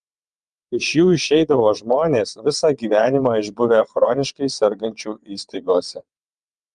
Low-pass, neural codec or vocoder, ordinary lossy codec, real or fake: 9.9 kHz; vocoder, 22.05 kHz, 80 mel bands, WaveNeXt; Opus, 32 kbps; fake